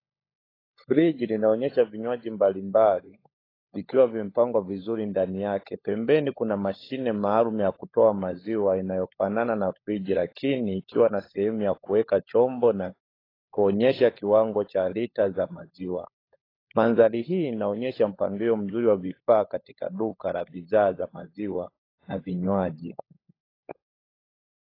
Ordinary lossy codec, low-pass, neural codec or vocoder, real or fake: AAC, 24 kbps; 5.4 kHz; codec, 16 kHz, 16 kbps, FunCodec, trained on LibriTTS, 50 frames a second; fake